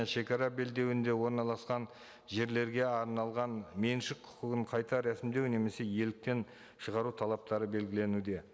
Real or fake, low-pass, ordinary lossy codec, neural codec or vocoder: real; none; none; none